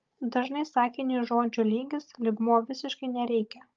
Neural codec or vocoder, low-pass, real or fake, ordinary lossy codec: codec, 16 kHz, 16 kbps, FunCodec, trained on Chinese and English, 50 frames a second; 7.2 kHz; fake; Opus, 32 kbps